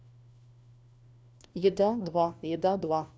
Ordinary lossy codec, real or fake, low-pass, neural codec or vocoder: none; fake; none; codec, 16 kHz, 1 kbps, FunCodec, trained on LibriTTS, 50 frames a second